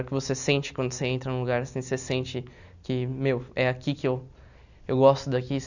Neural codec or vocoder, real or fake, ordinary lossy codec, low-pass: none; real; none; 7.2 kHz